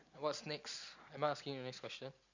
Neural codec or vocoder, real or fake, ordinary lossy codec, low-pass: none; real; Opus, 64 kbps; 7.2 kHz